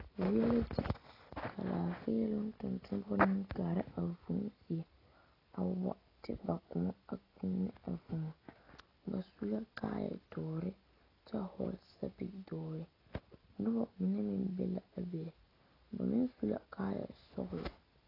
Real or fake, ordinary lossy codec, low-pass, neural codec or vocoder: real; AAC, 24 kbps; 5.4 kHz; none